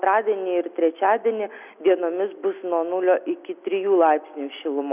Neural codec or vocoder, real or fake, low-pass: none; real; 3.6 kHz